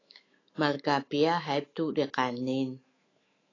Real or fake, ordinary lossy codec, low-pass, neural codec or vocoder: fake; AAC, 32 kbps; 7.2 kHz; autoencoder, 48 kHz, 128 numbers a frame, DAC-VAE, trained on Japanese speech